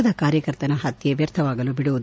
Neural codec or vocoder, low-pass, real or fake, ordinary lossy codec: none; none; real; none